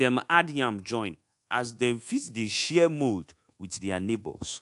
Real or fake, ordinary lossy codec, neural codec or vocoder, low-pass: fake; AAC, 64 kbps; codec, 24 kHz, 1.2 kbps, DualCodec; 10.8 kHz